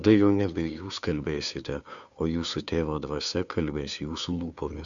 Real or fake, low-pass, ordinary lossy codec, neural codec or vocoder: fake; 7.2 kHz; Opus, 64 kbps; codec, 16 kHz, 2 kbps, FunCodec, trained on Chinese and English, 25 frames a second